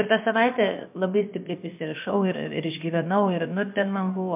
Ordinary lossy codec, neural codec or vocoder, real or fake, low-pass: MP3, 32 kbps; codec, 16 kHz, about 1 kbps, DyCAST, with the encoder's durations; fake; 3.6 kHz